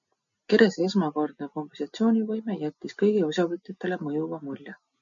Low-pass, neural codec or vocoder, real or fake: 7.2 kHz; none; real